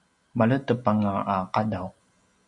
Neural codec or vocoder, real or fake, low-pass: none; real; 10.8 kHz